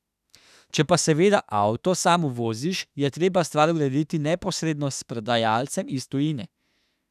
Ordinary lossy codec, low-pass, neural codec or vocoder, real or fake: none; 14.4 kHz; autoencoder, 48 kHz, 32 numbers a frame, DAC-VAE, trained on Japanese speech; fake